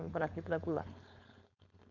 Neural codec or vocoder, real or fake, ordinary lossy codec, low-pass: codec, 16 kHz, 4.8 kbps, FACodec; fake; none; 7.2 kHz